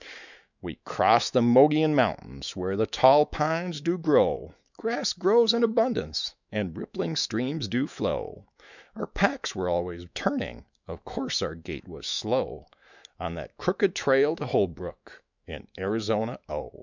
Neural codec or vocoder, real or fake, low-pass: autoencoder, 48 kHz, 128 numbers a frame, DAC-VAE, trained on Japanese speech; fake; 7.2 kHz